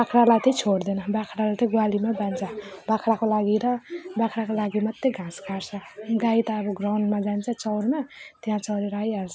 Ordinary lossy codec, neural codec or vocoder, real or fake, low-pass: none; none; real; none